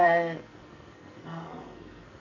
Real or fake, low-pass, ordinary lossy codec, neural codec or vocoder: fake; 7.2 kHz; none; codec, 44.1 kHz, 2.6 kbps, SNAC